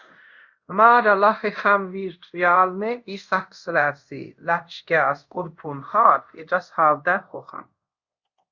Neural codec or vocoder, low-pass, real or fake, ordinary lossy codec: codec, 24 kHz, 0.5 kbps, DualCodec; 7.2 kHz; fake; Opus, 64 kbps